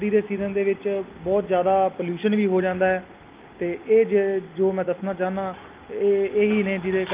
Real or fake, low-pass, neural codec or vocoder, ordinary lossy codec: real; 3.6 kHz; none; Opus, 32 kbps